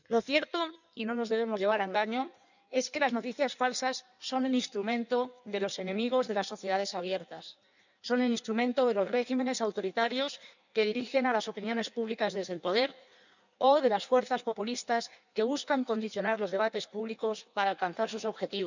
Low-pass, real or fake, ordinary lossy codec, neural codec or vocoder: 7.2 kHz; fake; none; codec, 16 kHz in and 24 kHz out, 1.1 kbps, FireRedTTS-2 codec